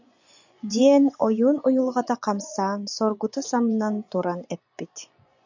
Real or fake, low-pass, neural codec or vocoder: fake; 7.2 kHz; vocoder, 24 kHz, 100 mel bands, Vocos